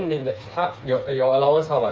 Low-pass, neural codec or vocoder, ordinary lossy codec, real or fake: none; codec, 16 kHz, 4 kbps, FreqCodec, smaller model; none; fake